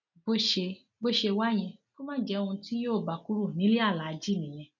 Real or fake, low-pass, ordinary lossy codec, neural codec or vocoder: real; 7.2 kHz; none; none